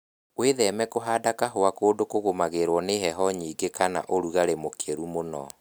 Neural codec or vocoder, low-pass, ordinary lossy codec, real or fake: none; none; none; real